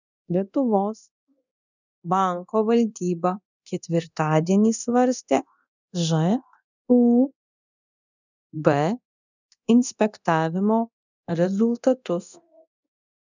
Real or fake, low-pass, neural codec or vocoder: fake; 7.2 kHz; codec, 24 kHz, 0.9 kbps, DualCodec